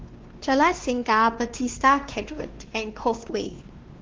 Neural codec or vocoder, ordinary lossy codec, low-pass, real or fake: codec, 16 kHz, 2 kbps, X-Codec, WavLM features, trained on Multilingual LibriSpeech; Opus, 16 kbps; 7.2 kHz; fake